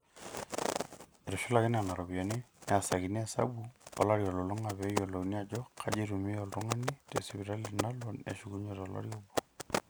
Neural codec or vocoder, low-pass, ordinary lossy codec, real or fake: none; none; none; real